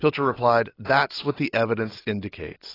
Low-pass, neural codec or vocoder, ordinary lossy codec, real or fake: 5.4 kHz; none; AAC, 24 kbps; real